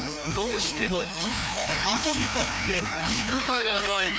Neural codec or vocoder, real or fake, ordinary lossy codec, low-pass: codec, 16 kHz, 1 kbps, FreqCodec, larger model; fake; none; none